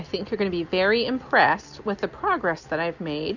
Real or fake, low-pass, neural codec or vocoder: real; 7.2 kHz; none